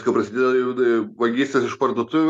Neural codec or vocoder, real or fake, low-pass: none; real; 14.4 kHz